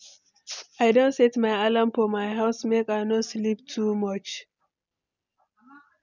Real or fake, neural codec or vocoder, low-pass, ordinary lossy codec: real; none; 7.2 kHz; none